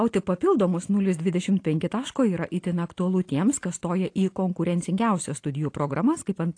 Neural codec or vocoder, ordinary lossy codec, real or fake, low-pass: none; AAC, 48 kbps; real; 9.9 kHz